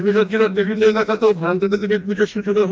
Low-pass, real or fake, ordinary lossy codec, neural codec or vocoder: none; fake; none; codec, 16 kHz, 1 kbps, FreqCodec, smaller model